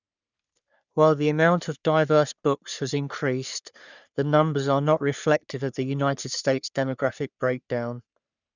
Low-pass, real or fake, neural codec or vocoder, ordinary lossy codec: 7.2 kHz; fake; codec, 44.1 kHz, 3.4 kbps, Pupu-Codec; none